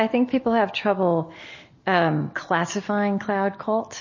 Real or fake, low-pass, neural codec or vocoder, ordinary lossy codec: real; 7.2 kHz; none; MP3, 32 kbps